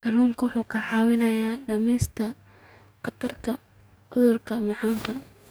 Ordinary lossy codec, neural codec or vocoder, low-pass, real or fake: none; codec, 44.1 kHz, 2.6 kbps, DAC; none; fake